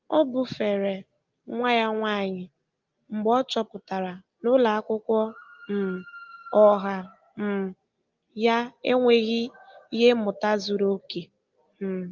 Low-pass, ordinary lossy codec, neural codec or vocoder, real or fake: 7.2 kHz; Opus, 32 kbps; none; real